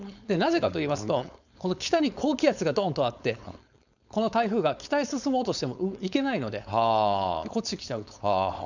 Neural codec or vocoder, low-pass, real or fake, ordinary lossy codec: codec, 16 kHz, 4.8 kbps, FACodec; 7.2 kHz; fake; none